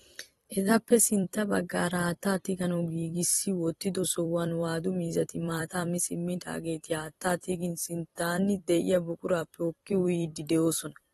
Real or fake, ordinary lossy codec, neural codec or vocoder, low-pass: fake; AAC, 32 kbps; vocoder, 44.1 kHz, 128 mel bands every 256 samples, BigVGAN v2; 19.8 kHz